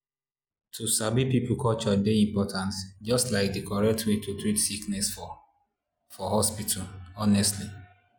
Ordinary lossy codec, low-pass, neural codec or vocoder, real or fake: none; none; none; real